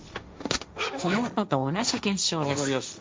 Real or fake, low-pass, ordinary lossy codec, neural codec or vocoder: fake; none; none; codec, 16 kHz, 1.1 kbps, Voila-Tokenizer